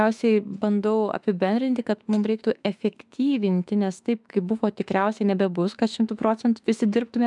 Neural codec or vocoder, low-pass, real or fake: autoencoder, 48 kHz, 32 numbers a frame, DAC-VAE, trained on Japanese speech; 10.8 kHz; fake